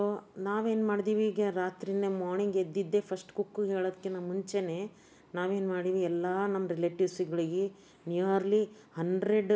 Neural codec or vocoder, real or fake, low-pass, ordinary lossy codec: none; real; none; none